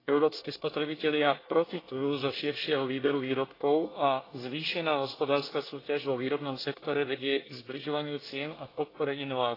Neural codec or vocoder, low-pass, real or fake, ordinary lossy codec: codec, 24 kHz, 1 kbps, SNAC; 5.4 kHz; fake; AAC, 24 kbps